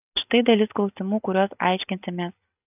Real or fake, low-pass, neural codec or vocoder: real; 3.6 kHz; none